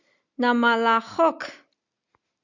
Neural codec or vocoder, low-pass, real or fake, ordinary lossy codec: none; 7.2 kHz; real; Opus, 64 kbps